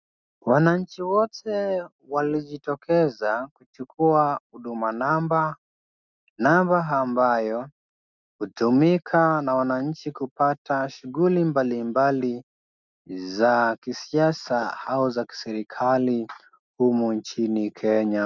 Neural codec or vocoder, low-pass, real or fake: none; 7.2 kHz; real